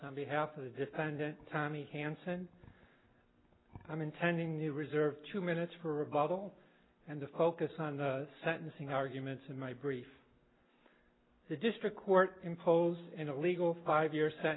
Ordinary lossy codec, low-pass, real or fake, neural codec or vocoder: AAC, 16 kbps; 7.2 kHz; real; none